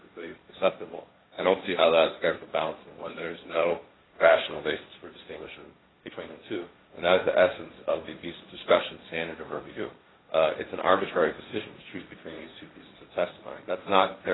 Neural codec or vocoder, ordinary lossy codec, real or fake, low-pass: codec, 16 kHz, 1.1 kbps, Voila-Tokenizer; AAC, 16 kbps; fake; 7.2 kHz